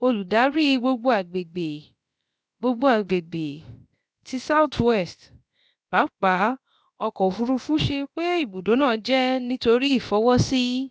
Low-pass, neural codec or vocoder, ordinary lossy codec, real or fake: none; codec, 16 kHz, about 1 kbps, DyCAST, with the encoder's durations; none; fake